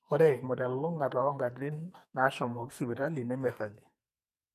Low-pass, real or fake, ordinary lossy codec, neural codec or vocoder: 14.4 kHz; fake; none; codec, 44.1 kHz, 2.6 kbps, SNAC